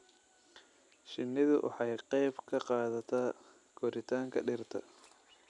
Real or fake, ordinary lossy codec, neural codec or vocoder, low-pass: real; none; none; 10.8 kHz